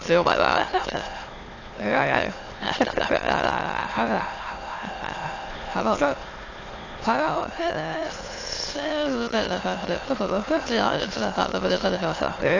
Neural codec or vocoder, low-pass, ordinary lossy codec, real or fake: autoencoder, 22.05 kHz, a latent of 192 numbers a frame, VITS, trained on many speakers; 7.2 kHz; AAC, 32 kbps; fake